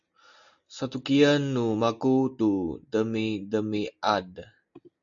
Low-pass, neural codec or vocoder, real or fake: 7.2 kHz; none; real